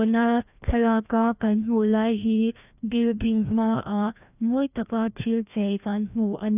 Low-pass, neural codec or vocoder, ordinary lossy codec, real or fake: 3.6 kHz; codec, 16 kHz, 1 kbps, FreqCodec, larger model; none; fake